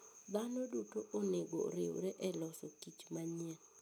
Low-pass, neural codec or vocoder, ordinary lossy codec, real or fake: none; none; none; real